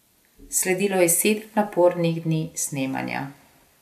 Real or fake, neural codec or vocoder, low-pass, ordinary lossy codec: real; none; 14.4 kHz; none